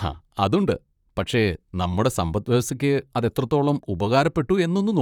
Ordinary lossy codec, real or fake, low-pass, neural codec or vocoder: none; fake; 19.8 kHz; vocoder, 44.1 kHz, 128 mel bands, Pupu-Vocoder